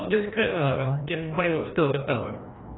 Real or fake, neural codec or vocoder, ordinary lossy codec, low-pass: fake; codec, 16 kHz, 1 kbps, FreqCodec, larger model; AAC, 16 kbps; 7.2 kHz